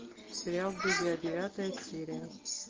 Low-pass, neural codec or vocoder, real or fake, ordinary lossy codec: 7.2 kHz; none; real; Opus, 24 kbps